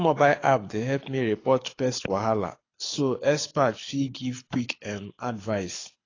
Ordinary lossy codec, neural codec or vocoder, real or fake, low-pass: AAC, 32 kbps; codec, 24 kHz, 6 kbps, HILCodec; fake; 7.2 kHz